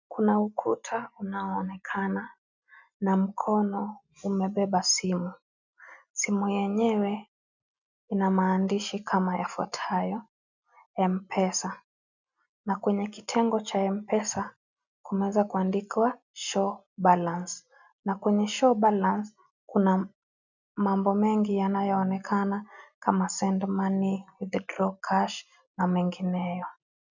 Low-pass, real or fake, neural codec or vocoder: 7.2 kHz; real; none